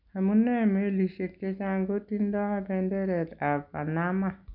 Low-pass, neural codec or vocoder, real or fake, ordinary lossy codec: 5.4 kHz; none; real; none